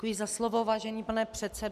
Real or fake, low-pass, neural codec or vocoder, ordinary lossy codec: real; 14.4 kHz; none; MP3, 96 kbps